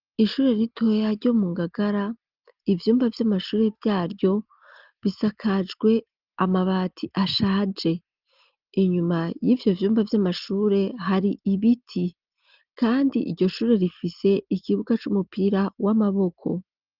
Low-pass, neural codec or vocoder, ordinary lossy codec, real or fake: 5.4 kHz; none; Opus, 32 kbps; real